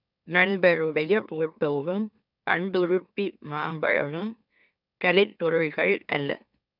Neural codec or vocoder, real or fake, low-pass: autoencoder, 44.1 kHz, a latent of 192 numbers a frame, MeloTTS; fake; 5.4 kHz